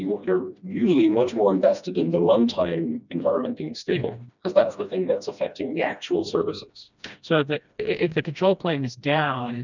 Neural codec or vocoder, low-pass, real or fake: codec, 16 kHz, 1 kbps, FreqCodec, smaller model; 7.2 kHz; fake